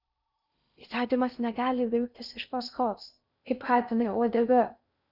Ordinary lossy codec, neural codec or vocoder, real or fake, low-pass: Opus, 64 kbps; codec, 16 kHz in and 24 kHz out, 0.6 kbps, FocalCodec, streaming, 2048 codes; fake; 5.4 kHz